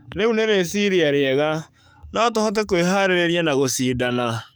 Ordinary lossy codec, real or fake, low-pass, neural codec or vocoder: none; fake; none; codec, 44.1 kHz, 7.8 kbps, DAC